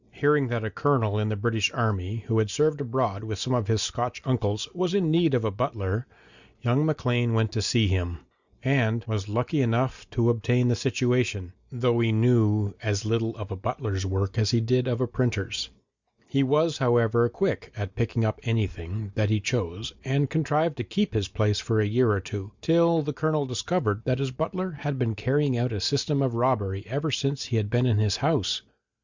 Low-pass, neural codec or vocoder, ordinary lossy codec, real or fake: 7.2 kHz; none; Opus, 64 kbps; real